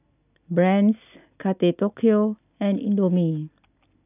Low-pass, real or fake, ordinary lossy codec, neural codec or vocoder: 3.6 kHz; real; none; none